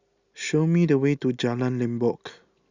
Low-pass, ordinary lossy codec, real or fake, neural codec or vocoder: 7.2 kHz; Opus, 64 kbps; real; none